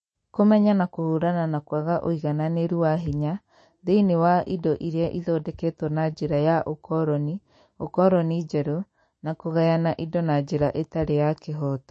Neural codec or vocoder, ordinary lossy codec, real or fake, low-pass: autoencoder, 48 kHz, 128 numbers a frame, DAC-VAE, trained on Japanese speech; MP3, 32 kbps; fake; 10.8 kHz